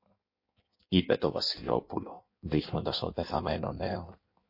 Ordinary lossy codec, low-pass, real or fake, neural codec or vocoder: MP3, 32 kbps; 5.4 kHz; fake; codec, 16 kHz in and 24 kHz out, 1.1 kbps, FireRedTTS-2 codec